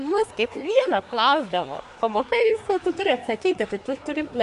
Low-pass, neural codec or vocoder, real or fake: 10.8 kHz; codec, 24 kHz, 1 kbps, SNAC; fake